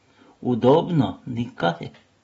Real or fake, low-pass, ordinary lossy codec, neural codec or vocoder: real; 19.8 kHz; AAC, 24 kbps; none